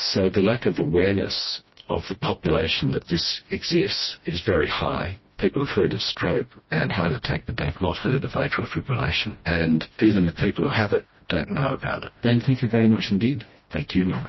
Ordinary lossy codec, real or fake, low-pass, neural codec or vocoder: MP3, 24 kbps; fake; 7.2 kHz; codec, 16 kHz, 1 kbps, FreqCodec, smaller model